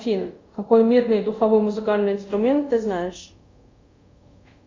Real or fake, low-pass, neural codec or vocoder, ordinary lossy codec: fake; 7.2 kHz; codec, 24 kHz, 0.5 kbps, DualCodec; MP3, 48 kbps